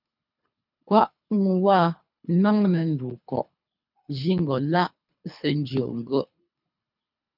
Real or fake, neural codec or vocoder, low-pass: fake; codec, 24 kHz, 3 kbps, HILCodec; 5.4 kHz